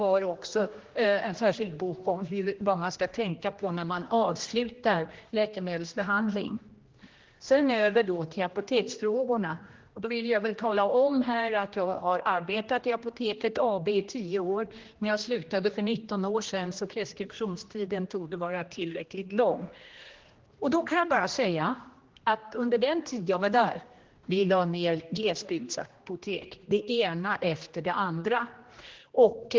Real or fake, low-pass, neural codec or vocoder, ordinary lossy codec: fake; 7.2 kHz; codec, 16 kHz, 1 kbps, X-Codec, HuBERT features, trained on general audio; Opus, 16 kbps